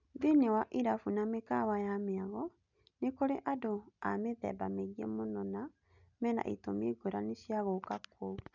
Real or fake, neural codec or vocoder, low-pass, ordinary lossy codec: real; none; 7.2 kHz; none